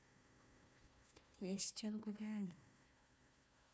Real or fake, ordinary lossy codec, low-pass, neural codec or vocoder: fake; none; none; codec, 16 kHz, 1 kbps, FunCodec, trained on Chinese and English, 50 frames a second